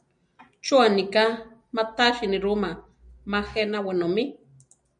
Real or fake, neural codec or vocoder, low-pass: real; none; 9.9 kHz